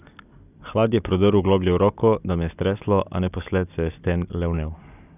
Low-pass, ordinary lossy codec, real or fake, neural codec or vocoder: 3.6 kHz; none; real; none